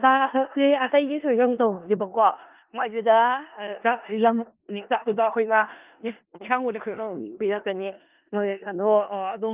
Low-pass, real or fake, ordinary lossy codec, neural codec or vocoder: 3.6 kHz; fake; Opus, 24 kbps; codec, 16 kHz in and 24 kHz out, 0.4 kbps, LongCat-Audio-Codec, four codebook decoder